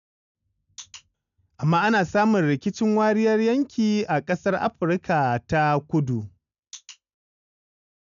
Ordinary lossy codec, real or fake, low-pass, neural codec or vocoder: none; real; 7.2 kHz; none